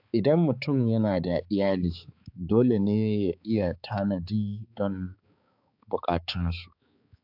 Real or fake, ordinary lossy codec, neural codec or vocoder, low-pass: fake; AAC, 48 kbps; codec, 16 kHz, 4 kbps, X-Codec, HuBERT features, trained on balanced general audio; 5.4 kHz